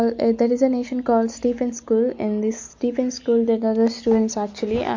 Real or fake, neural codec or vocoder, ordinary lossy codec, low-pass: real; none; MP3, 48 kbps; 7.2 kHz